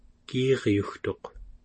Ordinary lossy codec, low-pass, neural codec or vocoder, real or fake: MP3, 32 kbps; 9.9 kHz; none; real